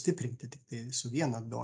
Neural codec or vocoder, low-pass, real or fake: none; 9.9 kHz; real